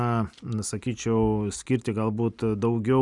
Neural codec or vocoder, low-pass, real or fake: none; 10.8 kHz; real